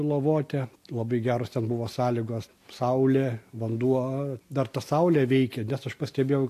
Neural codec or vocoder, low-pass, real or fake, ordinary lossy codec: none; 14.4 kHz; real; AAC, 64 kbps